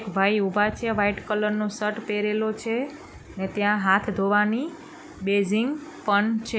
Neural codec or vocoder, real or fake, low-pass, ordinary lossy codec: none; real; none; none